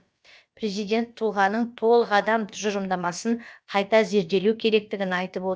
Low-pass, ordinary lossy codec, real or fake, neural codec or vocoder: none; none; fake; codec, 16 kHz, about 1 kbps, DyCAST, with the encoder's durations